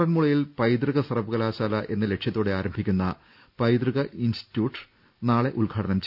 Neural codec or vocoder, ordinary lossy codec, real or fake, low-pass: none; none; real; 5.4 kHz